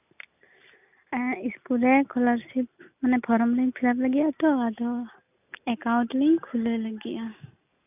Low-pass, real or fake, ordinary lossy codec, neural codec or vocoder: 3.6 kHz; real; none; none